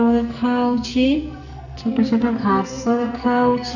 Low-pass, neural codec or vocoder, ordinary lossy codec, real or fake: 7.2 kHz; codec, 44.1 kHz, 2.6 kbps, SNAC; none; fake